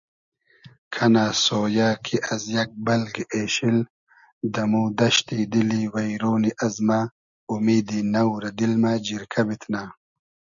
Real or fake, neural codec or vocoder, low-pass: real; none; 7.2 kHz